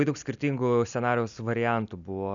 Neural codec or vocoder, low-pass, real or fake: none; 7.2 kHz; real